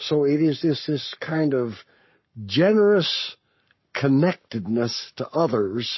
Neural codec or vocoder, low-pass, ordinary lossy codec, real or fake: codec, 44.1 kHz, 7.8 kbps, Pupu-Codec; 7.2 kHz; MP3, 24 kbps; fake